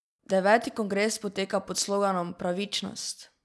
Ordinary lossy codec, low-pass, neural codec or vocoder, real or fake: none; none; none; real